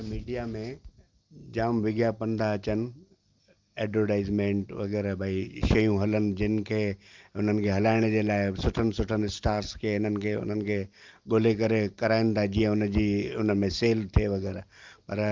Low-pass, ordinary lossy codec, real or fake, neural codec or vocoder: 7.2 kHz; Opus, 24 kbps; real; none